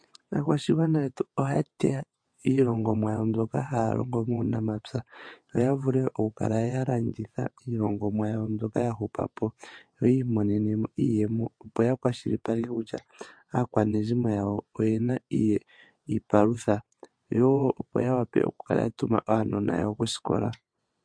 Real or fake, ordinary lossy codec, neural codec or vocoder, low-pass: fake; MP3, 48 kbps; vocoder, 22.05 kHz, 80 mel bands, WaveNeXt; 9.9 kHz